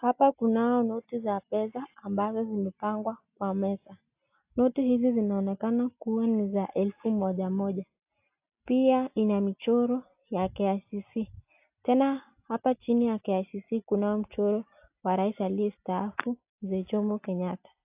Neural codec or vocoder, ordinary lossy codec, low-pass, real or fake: none; AAC, 32 kbps; 3.6 kHz; real